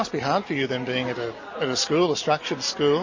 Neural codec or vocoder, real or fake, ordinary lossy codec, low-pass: none; real; MP3, 32 kbps; 7.2 kHz